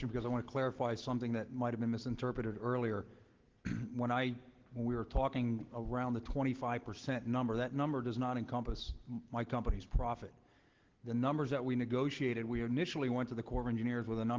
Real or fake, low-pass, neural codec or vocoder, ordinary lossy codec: real; 7.2 kHz; none; Opus, 16 kbps